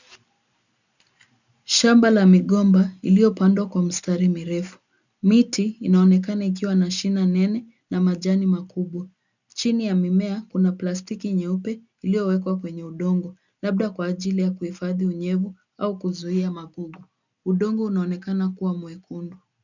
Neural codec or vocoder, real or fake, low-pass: none; real; 7.2 kHz